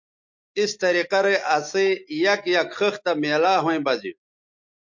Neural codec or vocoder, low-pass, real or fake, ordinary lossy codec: none; 7.2 kHz; real; MP3, 48 kbps